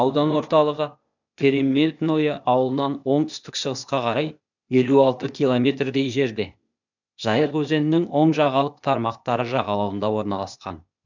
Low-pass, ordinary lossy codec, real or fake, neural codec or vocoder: 7.2 kHz; none; fake; codec, 16 kHz, 0.8 kbps, ZipCodec